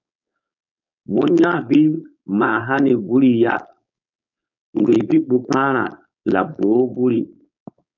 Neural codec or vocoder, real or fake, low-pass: codec, 16 kHz, 4.8 kbps, FACodec; fake; 7.2 kHz